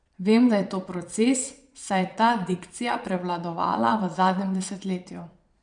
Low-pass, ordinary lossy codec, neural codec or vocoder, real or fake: 9.9 kHz; none; vocoder, 22.05 kHz, 80 mel bands, Vocos; fake